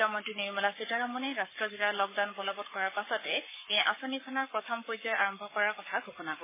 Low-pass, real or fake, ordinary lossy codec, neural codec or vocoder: 3.6 kHz; fake; MP3, 16 kbps; codec, 44.1 kHz, 7.8 kbps, Pupu-Codec